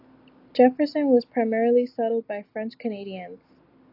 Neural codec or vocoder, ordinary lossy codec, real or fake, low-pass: none; AAC, 32 kbps; real; 5.4 kHz